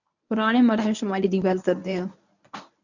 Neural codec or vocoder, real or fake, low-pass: codec, 24 kHz, 0.9 kbps, WavTokenizer, medium speech release version 1; fake; 7.2 kHz